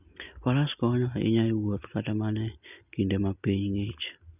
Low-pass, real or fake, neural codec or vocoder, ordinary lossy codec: 3.6 kHz; real; none; none